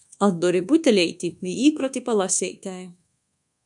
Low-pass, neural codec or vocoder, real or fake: 10.8 kHz; codec, 24 kHz, 1.2 kbps, DualCodec; fake